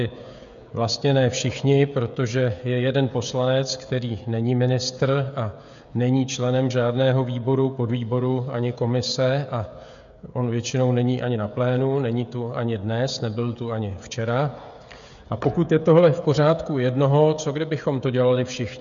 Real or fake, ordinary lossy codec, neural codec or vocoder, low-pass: fake; MP3, 64 kbps; codec, 16 kHz, 16 kbps, FreqCodec, smaller model; 7.2 kHz